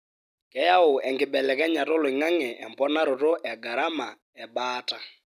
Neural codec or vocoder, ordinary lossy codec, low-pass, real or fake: none; none; 14.4 kHz; real